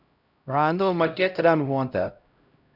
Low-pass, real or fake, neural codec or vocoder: 5.4 kHz; fake; codec, 16 kHz, 0.5 kbps, X-Codec, HuBERT features, trained on LibriSpeech